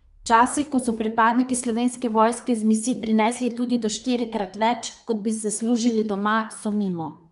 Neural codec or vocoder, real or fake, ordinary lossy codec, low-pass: codec, 24 kHz, 1 kbps, SNAC; fake; none; 10.8 kHz